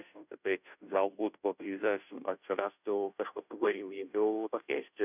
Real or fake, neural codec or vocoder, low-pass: fake; codec, 16 kHz, 0.5 kbps, FunCodec, trained on Chinese and English, 25 frames a second; 3.6 kHz